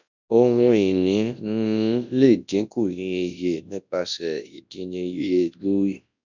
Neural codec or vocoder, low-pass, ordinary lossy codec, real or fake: codec, 24 kHz, 0.9 kbps, WavTokenizer, large speech release; 7.2 kHz; none; fake